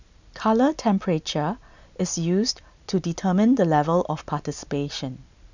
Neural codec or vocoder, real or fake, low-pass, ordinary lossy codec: none; real; 7.2 kHz; none